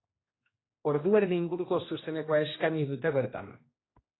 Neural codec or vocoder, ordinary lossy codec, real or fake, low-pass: codec, 16 kHz, 1 kbps, X-Codec, HuBERT features, trained on general audio; AAC, 16 kbps; fake; 7.2 kHz